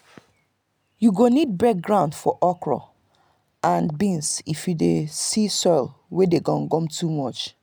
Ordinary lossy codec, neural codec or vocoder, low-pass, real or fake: none; none; none; real